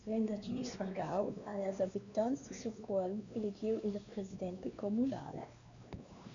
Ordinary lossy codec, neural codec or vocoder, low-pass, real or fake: MP3, 64 kbps; codec, 16 kHz, 2 kbps, X-Codec, WavLM features, trained on Multilingual LibriSpeech; 7.2 kHz; fake